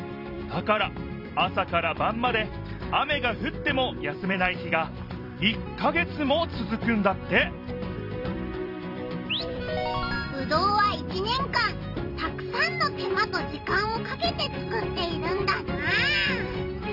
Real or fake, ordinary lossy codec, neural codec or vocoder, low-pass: fake; none; vocoder, 44.1 kHz, 128 mel bands every 256 samples, BigVGAN v2; 5.4 kHz